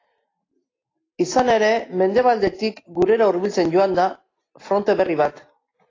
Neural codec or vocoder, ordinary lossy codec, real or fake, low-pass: none; AAC, 32 kbps; real; 7.2 kHz